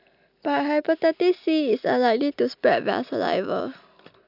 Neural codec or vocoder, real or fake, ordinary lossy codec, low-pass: none; real; none; 5.4 kHz